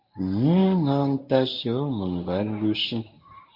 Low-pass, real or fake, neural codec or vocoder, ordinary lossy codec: 5.4 kHz; fake; codec, 24 kHz, 0.9 kbps, WavTokenizer, medium speech release version 1; MP3, 32 kbps